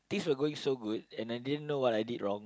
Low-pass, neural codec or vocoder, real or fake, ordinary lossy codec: none; none; real; none